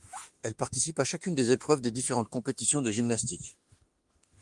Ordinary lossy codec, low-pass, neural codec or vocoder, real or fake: Opus, 24 kbps; 10.8 kHz; autoencoder, 48 kHz, 32 numbers a frame, DAC-VAE, trained on Japanese speech; fake